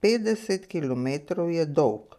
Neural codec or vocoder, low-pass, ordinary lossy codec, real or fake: none; 14.4 kHz; none; real